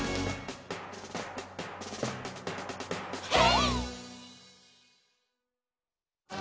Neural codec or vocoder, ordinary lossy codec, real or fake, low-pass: none; none; real; none